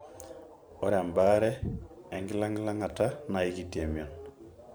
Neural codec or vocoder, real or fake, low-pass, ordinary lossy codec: vocoder, 44.1 kHz, 128 mel bands every 512 samples, BigVGAN v2; fake; none; none